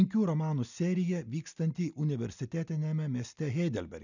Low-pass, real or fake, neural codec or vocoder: 7.2 kHz; real; none